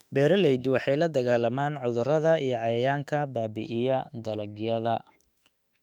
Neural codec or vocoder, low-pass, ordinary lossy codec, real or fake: autoencoder, 48 kHz, 32 numbers a frame, DAC-VAE, trained on Japanese speech; 19.8 kHz; none; fake